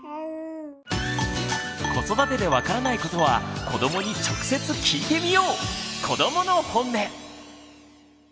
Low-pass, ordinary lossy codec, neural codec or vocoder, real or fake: none; none; none; real